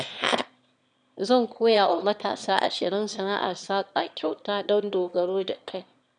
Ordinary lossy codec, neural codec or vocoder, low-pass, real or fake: none; autoencoder, 22.05 kHz, a latent of 192 numbers a frame, VITS, trained on one speaker; 9.9 kHz; fake